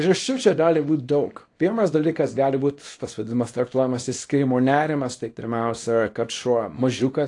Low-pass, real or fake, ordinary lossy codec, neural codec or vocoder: 10.8 kHz; fake; AAC, 48 kbps; codec, 24 kHz, 0.9 kbps, WavTokenizer, small release